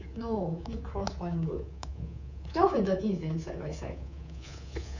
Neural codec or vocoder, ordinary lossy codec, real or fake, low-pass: codec, 24 kHz, 3.1 kbps, DualCodec; none; fake; 7.2 kHz